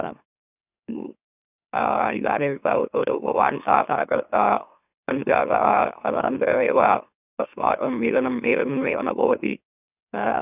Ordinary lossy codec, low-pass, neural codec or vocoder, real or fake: none; 3.6 kHz; autoencoder, 44.1 kHz, a latent of 192 numbers a frame, MeloTTS; fake